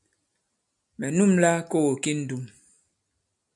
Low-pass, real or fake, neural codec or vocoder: 10.8 kHz; real; none